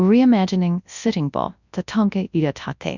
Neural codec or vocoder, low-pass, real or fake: codec, 24 kHz, 0.9 kbps, WavTokenizer, large speech release; 7.2 kHz; fake